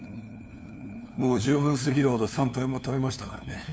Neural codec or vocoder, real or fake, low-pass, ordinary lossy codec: codec, 16 kHz, 2 kbps, FunCodec, trained on LibriTTS, 25 frames a second; fake; none; none